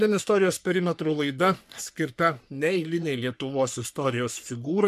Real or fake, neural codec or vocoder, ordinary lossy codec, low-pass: fake; codec, 44.1 kHz, 3.4 kbps, Pupu-Codec; MP3, 96 kbps; 14.4 kHz